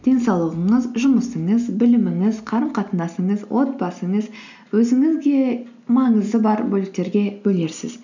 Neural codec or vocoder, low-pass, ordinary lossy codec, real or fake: none; 7.2 kHz; none; real